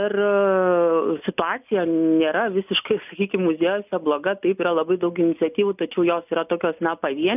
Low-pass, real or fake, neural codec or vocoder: 3.6 kHz; real; none